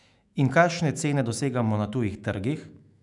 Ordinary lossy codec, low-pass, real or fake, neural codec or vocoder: none; 10.8 kHz; fake; autoencoder, 48 kHz, 128 numbers a frame, DAC-VAE, trained on Japanese speech